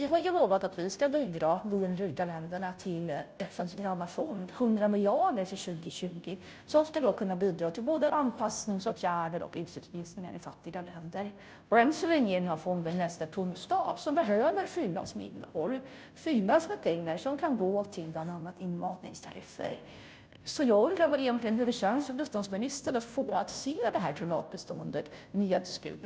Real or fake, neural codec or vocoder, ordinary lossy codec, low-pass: fake; codec, 16 kHz, 0.5 kbps, FunCodec, trained on Chinese and English, 25 frames a second; none; none